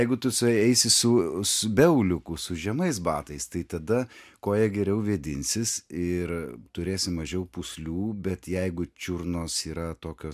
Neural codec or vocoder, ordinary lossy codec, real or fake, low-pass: none; MP3, 96 kbps; real; 14.4 kHz